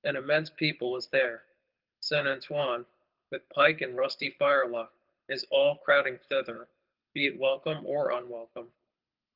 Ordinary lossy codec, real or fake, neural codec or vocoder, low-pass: Opus, 32 kbps; fake; codec, 24 kHz, 6 kbps, HILCodec; 5.4 kHz